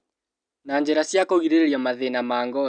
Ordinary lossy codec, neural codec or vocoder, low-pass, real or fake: none; none; 9.9 kHz; real